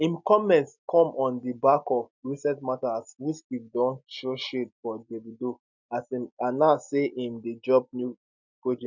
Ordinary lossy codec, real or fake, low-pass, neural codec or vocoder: none; real; 7.2 kHz; none